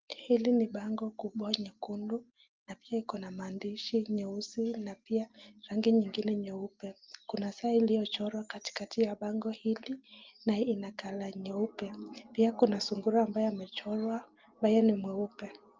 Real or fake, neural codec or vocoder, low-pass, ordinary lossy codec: real; none; 7.2 kHz; Opus, 24 kbps